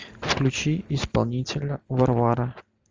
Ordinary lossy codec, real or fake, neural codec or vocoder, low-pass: Opus, 32 kbps; real; none; 7.2 kHz